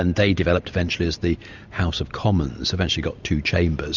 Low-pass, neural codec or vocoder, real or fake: 7.2 kHz; none; real